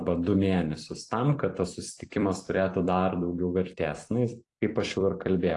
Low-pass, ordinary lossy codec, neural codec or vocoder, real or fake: 10.8 kHz; AAC, 48 kbps; vocoder, 44.1 kHz, 128 mel bands every 256 samples, BigVGAN v2; fake